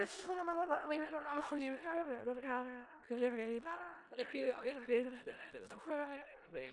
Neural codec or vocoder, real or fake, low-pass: codec, 16 kHz in and 24 kHz out, 0.4 kbps, LongCat-Audio-Codec, four codebook decoder; fake; 10.8 kHz